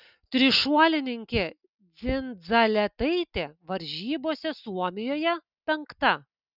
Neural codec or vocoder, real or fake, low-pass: none; real; 5.4 kHz